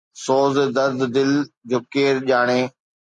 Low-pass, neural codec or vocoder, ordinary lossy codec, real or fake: 10.8 kHz; none; MP3, 48 kbps; real